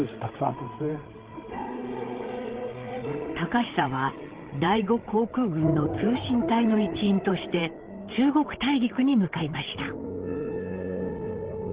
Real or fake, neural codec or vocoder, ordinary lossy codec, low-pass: fake; codec, 16 kHz, 8 kbps, FreqCodec, larger model; Opus, 16 kbps; 3.6 kHz